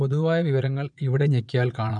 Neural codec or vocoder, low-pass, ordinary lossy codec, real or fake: vocoder, 22.05 kHz, 80 mel bands, Vocos; 9.9 kHz; none; fake